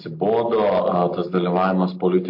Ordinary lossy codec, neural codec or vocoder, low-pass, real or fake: MP3, 32 kbps; none; 5.4 kHz; real